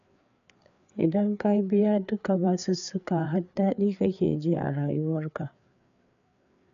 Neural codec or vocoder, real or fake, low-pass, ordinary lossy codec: codec, 16 kHz, 4 kbps, FreqCodec, larger model; fake; 7.2 kHz; none